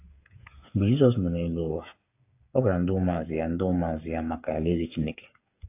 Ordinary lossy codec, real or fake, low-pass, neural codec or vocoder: AAC, 24 kbps; fake; 3.6 kHz; codec, 16 kHz, 8 kbps, FreqCodec, smaller model